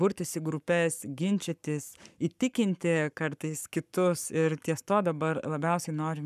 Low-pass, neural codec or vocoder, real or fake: 14.4 kHz; codec, 44.1 kHz, 7.8 kbps, Pupu-Codec; fake